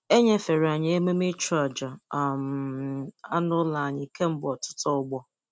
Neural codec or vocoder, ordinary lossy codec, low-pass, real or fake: none; none; none; real